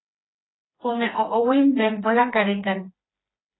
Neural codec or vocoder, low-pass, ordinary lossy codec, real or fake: codec, 16 kHz, 2 kbps, FreqCodec, smaller model; 7.2 kHz; AAC, 16 kbps; fake